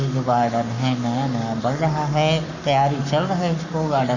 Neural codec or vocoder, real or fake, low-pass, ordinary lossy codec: codec, 44.1 kHz, 7.8 kbps, Pupu-Codec; fake; 7.2 kHz; none